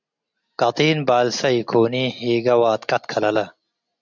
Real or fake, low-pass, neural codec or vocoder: real; 7.2 kHz; none